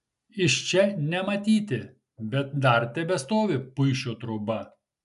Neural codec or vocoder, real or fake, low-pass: none; real; 10.8 kHz